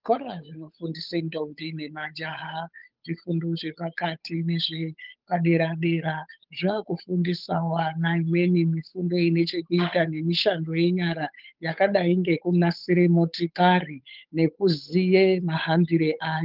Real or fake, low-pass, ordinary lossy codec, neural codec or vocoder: fake; 5.4 kHz; Opus, 32 kbps; codec, 16 kHz, 8 kbps, FunCodec, trained on Chinese and English, 25 frames a second